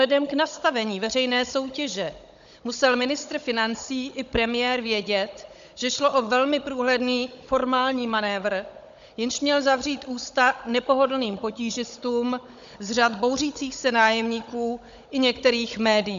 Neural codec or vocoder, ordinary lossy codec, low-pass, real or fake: codec, 16 kHz, 16 kbps, FunCodec, trained on Chinese and English, 50 frames a second; MP3, 64 kbps; 7.2 kHz; fake